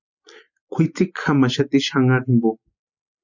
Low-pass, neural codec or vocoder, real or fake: 7.2 kHz; none; real